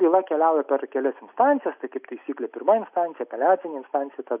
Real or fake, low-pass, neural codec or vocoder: real; 3.6 kHz; none